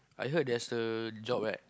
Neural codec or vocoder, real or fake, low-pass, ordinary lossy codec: none; real; none; none